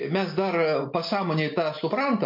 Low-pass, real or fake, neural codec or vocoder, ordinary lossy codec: 5.4 kHz; real; none; MP3, 32 kbps